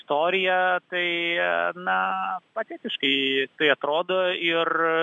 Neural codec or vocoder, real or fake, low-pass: none; real; 10.8 kHz